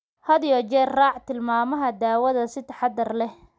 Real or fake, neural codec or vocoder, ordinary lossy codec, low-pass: real; none; none; none